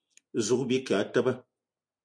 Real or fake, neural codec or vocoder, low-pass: real; none; 9.9 kHz